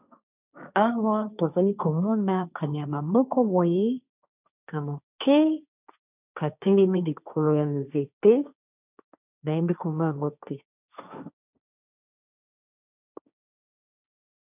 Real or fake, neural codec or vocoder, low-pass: fake; codec, 16 kHz, 1.1 kbps, Voila-Tokenizer; 3.6 kHz